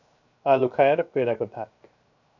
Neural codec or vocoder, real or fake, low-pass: codec, 16 kHz, 0.7 kbps, FocalCodec; fake; 7.2 kHz